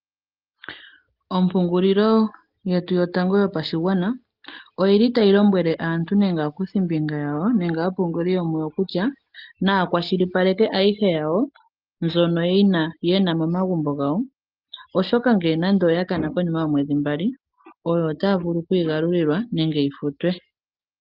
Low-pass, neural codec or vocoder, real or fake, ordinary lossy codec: 5.4 kHz; none; real; Opus, 24 kbps